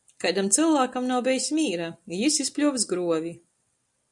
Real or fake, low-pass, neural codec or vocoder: real; 10.8 kHz; none